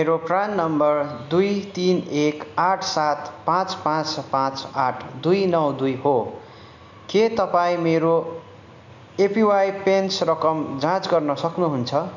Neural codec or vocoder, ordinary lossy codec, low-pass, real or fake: none; none; 7.2 kHz; real